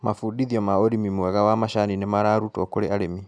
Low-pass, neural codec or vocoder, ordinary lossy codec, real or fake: 9.9 kHz; none; none; real